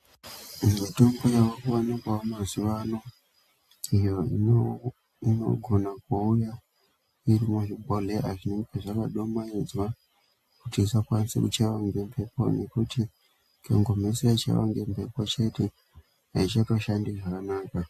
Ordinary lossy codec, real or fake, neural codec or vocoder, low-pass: AAC, 64 kbps; real; none; 14.4 kHz